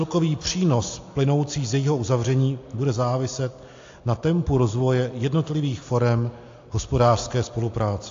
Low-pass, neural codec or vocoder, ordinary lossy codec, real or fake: 7.2 kHz; none; AAC, 48 kbps; real